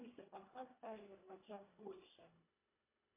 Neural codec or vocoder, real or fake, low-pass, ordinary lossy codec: codec, 24 kHz, 1.5 kbps, HILCodec; fake; 3.6 kHz; MP3, 24 kbps